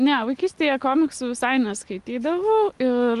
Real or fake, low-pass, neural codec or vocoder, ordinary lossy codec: real; 10.8 kHz; none; Opus, 24 kbps